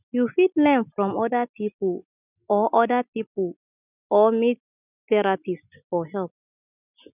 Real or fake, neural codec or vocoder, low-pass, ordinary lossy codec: real; none; 3.6 kHz; none